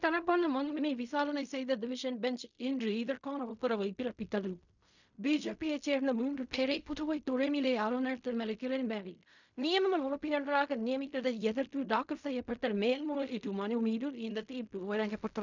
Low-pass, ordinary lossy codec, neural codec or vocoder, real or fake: 7.2 kHz; none; codec, 16 kHz in and 24 kHz out, 0.4 kbps, LongCat-Audio-Codec, fine tuned four codebook decoder; fake